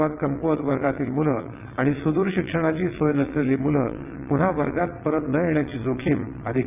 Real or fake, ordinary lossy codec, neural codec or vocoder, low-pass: fake; none; vocoder, 22.05 kHz, 80 mel bands, WaveNeXt; 3.6 kHz